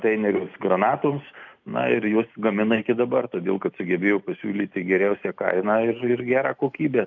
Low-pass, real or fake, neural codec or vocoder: 7.2 kHz; real; none